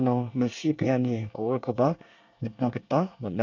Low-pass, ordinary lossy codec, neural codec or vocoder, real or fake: 7.2 kHz; MP3, 64 kbps; codec, 24 kHz, 1 kbps, SNAC; fake